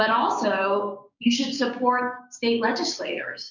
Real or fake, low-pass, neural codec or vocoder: fake; 7.2 kHz; codec, 16 kHz, 6 kbps, DAC